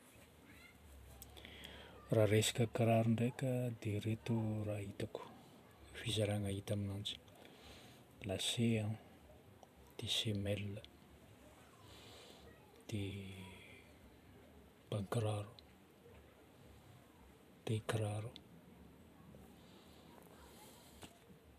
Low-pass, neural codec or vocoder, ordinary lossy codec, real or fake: 14.4 kHz; vocoder, 44.1 kHz, 128 mel bands every 512 samples, BigVGAN v2; none; fake